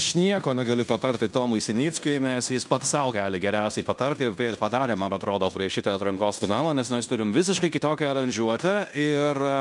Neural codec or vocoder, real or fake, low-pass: codec, 16 kHz in and 24 kHz out, 0.9 kbps, LongCat-Audio-Codec, fine tuned four codebook decoder; fake; 10.8 kHz